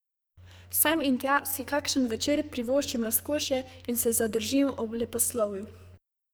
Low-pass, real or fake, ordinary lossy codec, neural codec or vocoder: none; fake; none; codec, 44.1 kHz, 2.6 kbps, SNAC